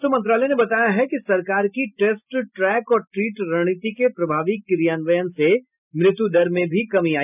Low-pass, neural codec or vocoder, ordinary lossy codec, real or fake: 3.6 kHz; none; none; real